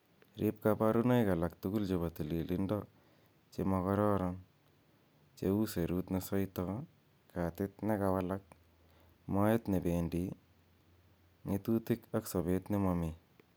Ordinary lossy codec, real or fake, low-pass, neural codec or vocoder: none; real; none; none